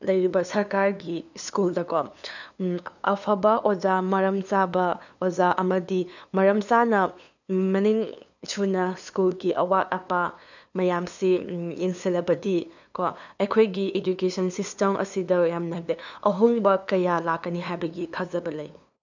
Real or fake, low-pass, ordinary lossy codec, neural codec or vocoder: fake; 7.2 kHz; none; codec, 16 kHz, 2 kbps, FunCodec, trained on LibriTTS, 25 frames a second